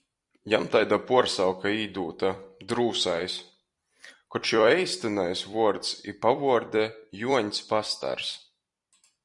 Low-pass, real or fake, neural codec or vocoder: 10.8 kHz; fake; vocoder, 44.1 kHz, 128 mel bands every 256 samples, BigVGAN v2